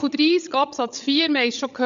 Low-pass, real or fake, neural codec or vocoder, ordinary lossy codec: 7.2 kHz; fake; codec, 16 kHz, 16 kbps, FreqCodec, larger model; none